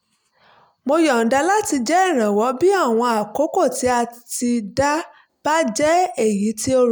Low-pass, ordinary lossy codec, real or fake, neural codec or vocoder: none; none; real; none